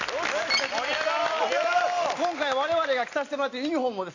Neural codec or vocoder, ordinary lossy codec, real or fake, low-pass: none; AAC, 48 kbps; real; 7.2 kHz